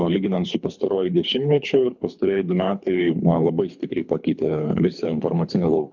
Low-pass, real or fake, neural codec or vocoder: 7.2 kHz; fake; codec, 24 kHz, 3 kbps, HILCodec